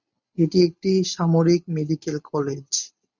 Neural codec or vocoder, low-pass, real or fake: none; 7.2 kHz; real